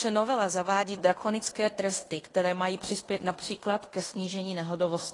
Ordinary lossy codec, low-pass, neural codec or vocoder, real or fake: AAC, 32 kbps; 10.8 kHz; codec, 16 kHz in and 24 kHz out, 0.9 kbps, LongCat-Audio-Codec, fine tuned four codebook decoder; fake